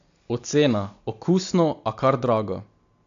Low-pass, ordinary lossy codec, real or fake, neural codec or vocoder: 7.2 kHz; AAC, 64 kbps; real; none